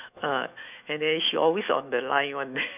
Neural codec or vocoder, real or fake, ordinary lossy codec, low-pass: autoencoder, 48 kHz, 128 numbers a frame, DAC-VAE, trained on Japanese speech; fake; none; 3.6 kHz